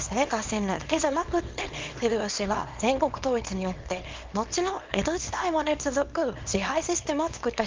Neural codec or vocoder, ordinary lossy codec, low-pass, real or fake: codec, 24 kHz, 0.9 kbps, WavTokenizer, small release; Opus, 32 kbps; 7.2 kHz; fake